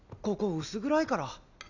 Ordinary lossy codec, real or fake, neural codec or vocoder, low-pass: none; real; none; 7.2 kHz